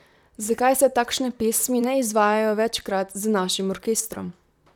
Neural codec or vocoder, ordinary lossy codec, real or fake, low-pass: vocoder, 44.1 kHz, 128 mel bands, Pupu-Vocoder; none; fake; 19.8 kHz